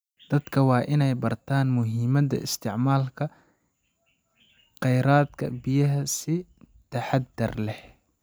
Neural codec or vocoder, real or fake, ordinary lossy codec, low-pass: none; real; none; none